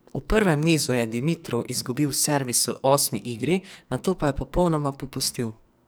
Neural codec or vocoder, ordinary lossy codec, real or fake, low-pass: codec, 44.1 kHz, 2.6 kbps, SNAC; none; fake; none